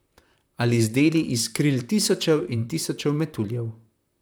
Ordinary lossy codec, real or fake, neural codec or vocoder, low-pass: none; fake; vocoder, 44.1 kHz, 128 mel bands, Pupu-Vocoder; none